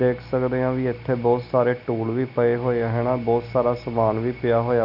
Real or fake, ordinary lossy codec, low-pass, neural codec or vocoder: real; none; 5.4 kHz; none